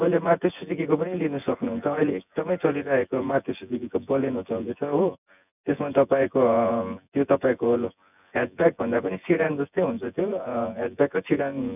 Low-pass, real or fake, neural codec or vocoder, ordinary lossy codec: 3.6 kHz; fake; vocoder, 24 kHz, 100 mel bands, Vocos; none